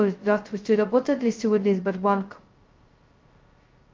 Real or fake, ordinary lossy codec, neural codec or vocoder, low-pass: fake; Opus, 24 kbps; codec, 16 kHz, 0.2 kbps, FocalCodec; 7.2 kHz